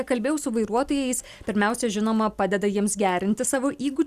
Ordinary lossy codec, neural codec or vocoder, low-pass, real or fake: Opus, 64 kbps; none; 14.4 kHz; real